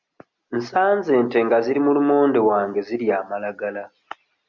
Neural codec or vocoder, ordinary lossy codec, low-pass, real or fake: none; MP3, 64 kbps; 7.2 kHz; real